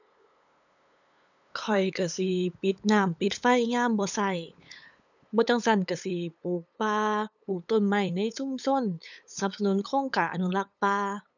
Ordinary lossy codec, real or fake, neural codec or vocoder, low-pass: none; fake; codec, 16 kHz, 8 kbps, FunCodec, trained on LibriTTS, 25 frames a second; 7.2 kHz